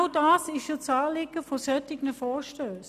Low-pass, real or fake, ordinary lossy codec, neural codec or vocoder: 14.4 kHz; real; none; none